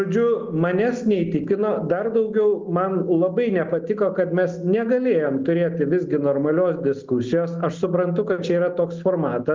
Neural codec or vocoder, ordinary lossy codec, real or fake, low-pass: none; Opus, 32 kbps; real; 7.2 kHz